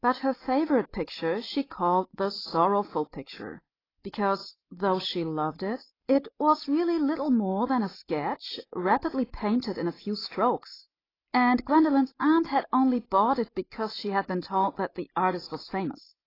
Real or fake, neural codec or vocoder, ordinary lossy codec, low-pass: real; none; AAC, 24 kbps; 5.4 kHz